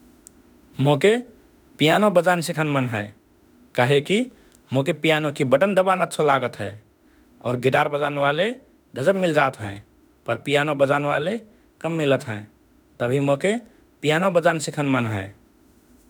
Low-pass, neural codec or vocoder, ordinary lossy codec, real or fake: none; autoencoder, 48 kHz, 32 numbers a frame, DAC-VAE, trained on Japanese speech; none; fake